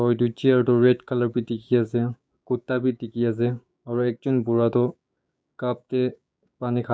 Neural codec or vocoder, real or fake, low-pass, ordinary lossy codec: codec, 16 kHz, 6 kbps, DAC; fake; none; none